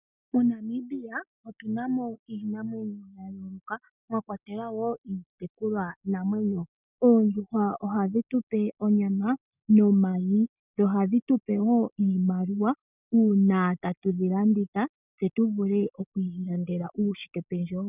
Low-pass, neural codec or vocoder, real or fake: 3.6 kHz; none; real